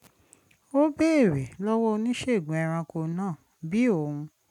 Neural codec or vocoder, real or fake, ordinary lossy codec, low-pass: none; real; none; 19.8 kHz